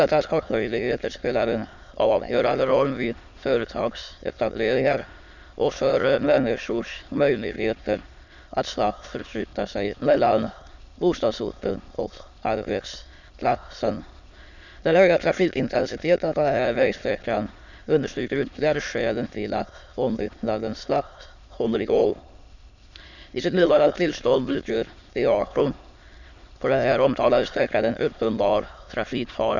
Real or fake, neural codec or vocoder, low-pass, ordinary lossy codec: fake; autoencoder, 22.05 kHz, a latent of 192 numbers a frame, VITS, trained on many speakers; 7.2 kHz; none